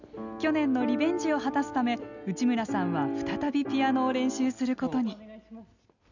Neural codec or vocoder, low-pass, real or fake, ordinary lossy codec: none; 7.2 kHz; real; none